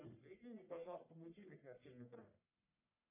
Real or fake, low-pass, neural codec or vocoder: fake; 3.6 kHz; codec, 44.1 kHz, 1.7 kbps, Pupu-Codec